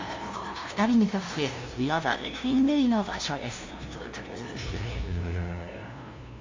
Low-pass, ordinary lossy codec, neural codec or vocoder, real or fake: 7.2 kHz; MP3, 48 kbps; codec, 16 kHz, 0.5 kbps, FunCodec, trained on LibriTTS, 25 frames a second; fake